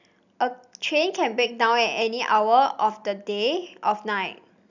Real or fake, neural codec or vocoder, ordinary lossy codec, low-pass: real; none; none; 7.2 kHz